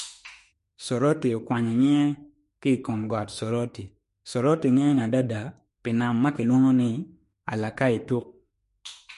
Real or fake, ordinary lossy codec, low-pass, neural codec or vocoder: fake; MP3, 48 kbps; 14.4 kHz; autoencoder, 48 kHz, 32 numbers a frame, DAC-VAE, trained on Japanese speech